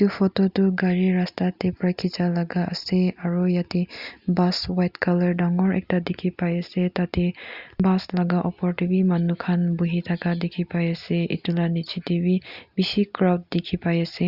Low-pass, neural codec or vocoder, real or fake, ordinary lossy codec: 5.4 kHz; none; real; Opus, 64 kbps